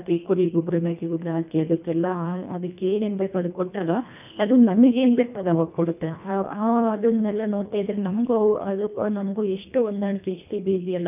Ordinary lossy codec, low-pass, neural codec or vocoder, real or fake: none; 3.6 kHz; codec, 24 kHz, 1.5 kbps, HILCodec; fake